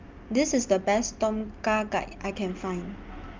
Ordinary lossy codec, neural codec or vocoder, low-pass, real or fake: Opus, 24 kbps; none; 7.2 kHz; real